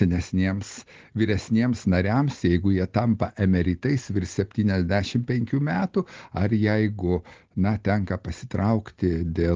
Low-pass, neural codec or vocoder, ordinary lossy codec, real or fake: 7.2 kHz; none; Opus, 16 kbps; real